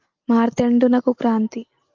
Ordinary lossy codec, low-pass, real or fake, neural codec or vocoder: Opus, 32 kbps; 7.2 kHz; real; none